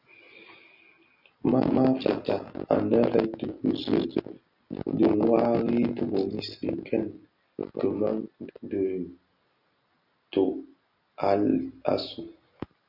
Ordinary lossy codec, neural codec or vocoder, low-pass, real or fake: Opus, 64 kbps; none; 5.4 kHz; real